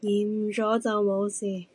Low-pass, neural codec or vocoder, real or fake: 10.8 kHz; none; real